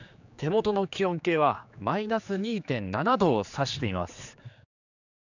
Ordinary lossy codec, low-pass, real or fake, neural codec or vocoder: none; 7.2 kHz; fake; codec, 16 kHz, 4 kbps, X-Codec, HuBERT features, trained on general audio